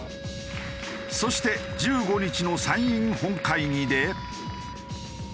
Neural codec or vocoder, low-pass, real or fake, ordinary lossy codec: none; none; real; none